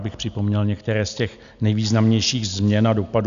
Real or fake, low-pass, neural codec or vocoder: real; 7.2 kHz; none